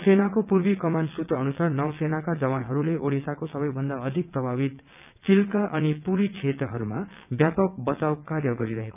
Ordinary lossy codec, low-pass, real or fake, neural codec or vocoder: none; 3.6 kHz; fake; vocoder, 22.05 kHz, 80 mel bands, WaveNeXt